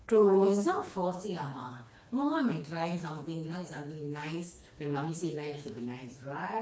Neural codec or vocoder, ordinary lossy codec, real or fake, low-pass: codec, 16 kHz, 2 kbps, FreqCodec, smaller model; none; fake; none